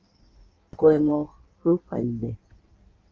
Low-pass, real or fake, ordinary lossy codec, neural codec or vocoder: 7.2 kHz; fake; Opus, 24 kbps; codec, 16 kHz in and 24 kHz out, 1.1 kbps, FireRedTTS-2 codec